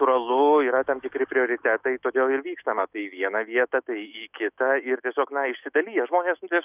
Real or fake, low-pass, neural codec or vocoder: fake; 3.6 kHz; autoencoder, 48 kHz, 128 numbers a frame, DAC-VAE, trained on Japanese speech